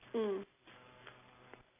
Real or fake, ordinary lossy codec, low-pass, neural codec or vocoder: real; AAC, 16 kbps; 3.6 kHz; none